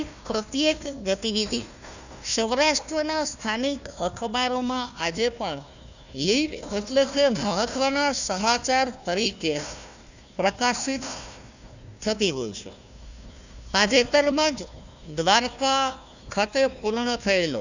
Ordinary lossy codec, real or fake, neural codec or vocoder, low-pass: none; fake; codec, 16 kHz, 1 kbps, FunCodec, trained on Chinese and English, 50 frames a second; 7.2 kHz